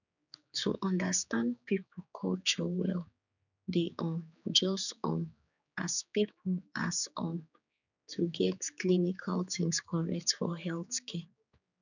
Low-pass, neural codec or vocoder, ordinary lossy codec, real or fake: 7.2 kHz; codec, 16 kHz, 4 kbps, X-Codec, HuBERT features, trained on general audio; none; fake